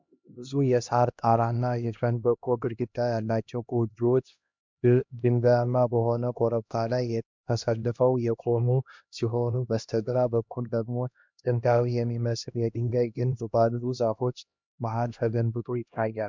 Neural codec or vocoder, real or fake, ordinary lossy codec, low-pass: codec, 16 kHz, 1 kbps, X-Codec, HuBERT features, trained on LibriSpeech; fake; MP3, 64 kbps; 7.2 kHz